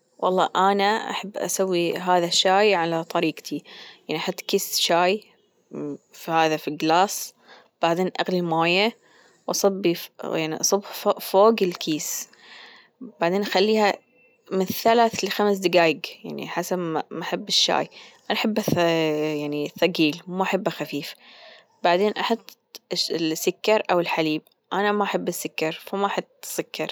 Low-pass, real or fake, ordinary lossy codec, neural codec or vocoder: none; real; none; none